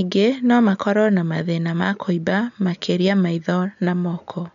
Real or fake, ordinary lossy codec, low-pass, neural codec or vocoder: real; none; 7.2 kHz; none